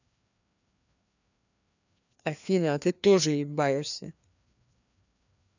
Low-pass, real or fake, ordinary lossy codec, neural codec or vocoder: 7.2 kHz; fake; none; codec, 16 kHz, 2 kbps, FreqCodec, larger model